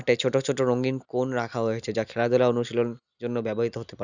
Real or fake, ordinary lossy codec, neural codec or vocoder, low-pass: real; none; none; 7.2 kHz